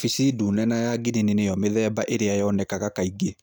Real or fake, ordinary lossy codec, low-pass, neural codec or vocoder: real; none; none; none